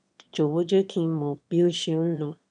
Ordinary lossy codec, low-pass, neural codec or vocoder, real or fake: none; 9.9 kHz; autoencoder, 22.05 kHz, a latent of 192 numbers a frame, VITS, trained on one speaker; fake